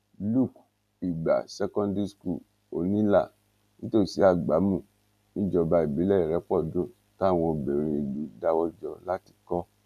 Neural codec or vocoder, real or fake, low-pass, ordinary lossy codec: none; real; 14.4 kHz; none